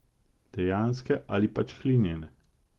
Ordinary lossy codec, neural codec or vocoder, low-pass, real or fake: Opus, 16 kbps; none; 19.8 kHz; real